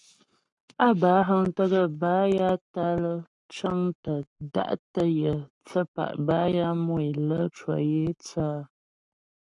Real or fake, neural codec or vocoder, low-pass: fake; codec, 44.1 kHz, 7.8 kbps, Pupu-Codec; 10.8 kHz